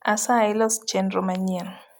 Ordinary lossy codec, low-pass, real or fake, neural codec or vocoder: none; none; real; none